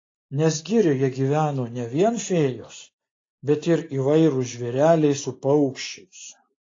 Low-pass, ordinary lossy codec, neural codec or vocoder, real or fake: 7.2 kHz; AAC, 32 kbps; none; real